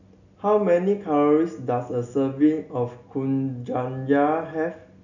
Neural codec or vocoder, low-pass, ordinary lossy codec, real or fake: none; 7.2 kHz; none; real